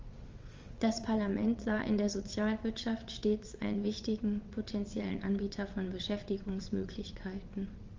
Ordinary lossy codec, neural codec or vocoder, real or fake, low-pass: Opus, 32 kbps; vocoder, 22.05 kHz, 80 mel bands, WaveNeXt; fake; 7.2 kHz